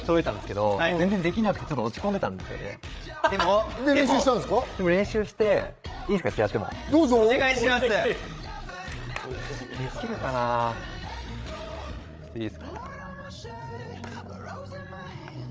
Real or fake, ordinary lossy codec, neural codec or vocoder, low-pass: fake; none; codec, 16 kHz, 8 kbps, FreqCodec, larger model; none